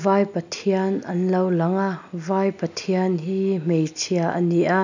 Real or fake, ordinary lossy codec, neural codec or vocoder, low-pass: real; none; none; 7.2 kHz